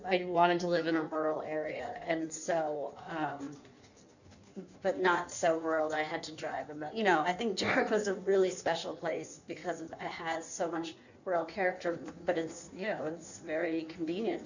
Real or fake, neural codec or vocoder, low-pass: fake; codec, 16 kHz in and 24 kHz out, 1.1 kbps, FireRedTTS-2 codec; 7.2 kHz